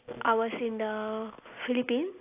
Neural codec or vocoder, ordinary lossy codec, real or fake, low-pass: none; AAC, 32 kbps; real; 3.6 kHz